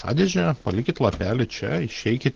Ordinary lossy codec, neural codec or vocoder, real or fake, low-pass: Opus, 16 kbps; none; real; 7.2 kHz